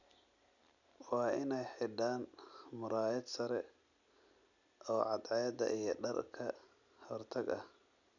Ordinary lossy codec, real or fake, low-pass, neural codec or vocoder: none; real; 7.2 kHz; none